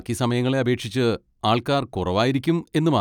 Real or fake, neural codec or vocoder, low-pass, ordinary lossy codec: real; none; 19.8 kHz; none